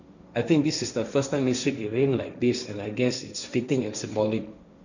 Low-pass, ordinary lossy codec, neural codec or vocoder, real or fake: 7.2 kHz; none; codec, 16 kHz, 1.1 kbps, Voila-Tokenizer; fake